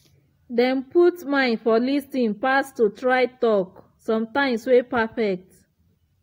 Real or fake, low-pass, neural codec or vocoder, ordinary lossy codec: real; 19.8 kHz; none; AAC, 48 kbps